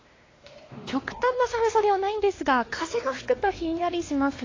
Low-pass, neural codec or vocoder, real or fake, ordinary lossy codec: 7.2 kHz; codec, 16 kHz, 2 kbps, X-Codec, WavLM features, trained on Multilingual LibriSpeech; fake; AAC, 32 kbps